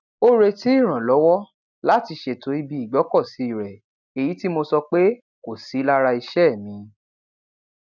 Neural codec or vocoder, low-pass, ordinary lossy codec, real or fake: none; 7.2 kHz; none; real